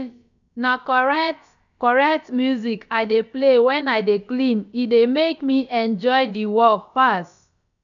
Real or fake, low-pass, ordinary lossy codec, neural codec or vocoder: fake; 7.2 kHz; none; codec, 16 kHz, about 1 kbps, DyCAST, with the encoder's durations